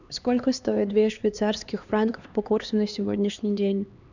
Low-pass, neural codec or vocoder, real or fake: 7.2 kHz; codec, 16 kHz, 2 kbps, X-Codec, HuBERT features, trained on LibriSpeech; fake